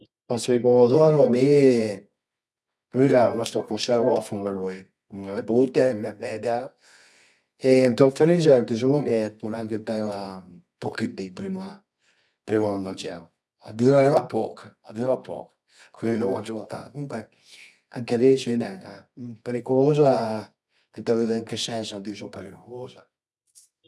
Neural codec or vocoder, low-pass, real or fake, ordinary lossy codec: codec, 24 kHz, 0.9 kbps, WavTokenizer, medium music audio release; none; fake; none